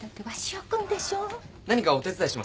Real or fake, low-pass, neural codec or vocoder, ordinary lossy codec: real; none; none; none